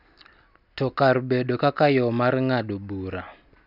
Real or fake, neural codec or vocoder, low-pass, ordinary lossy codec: real; none; 5.4 kHz; none